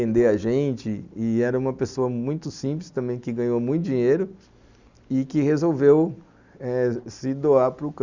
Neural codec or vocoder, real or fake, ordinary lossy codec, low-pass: none; real; Opus, 64 kbps; 7.2 kHz